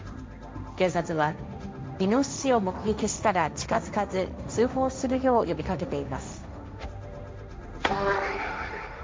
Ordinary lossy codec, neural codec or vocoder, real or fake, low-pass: none; codec, 16 kHz, 1.1 kbps, Voila-Tokenizer; fake; none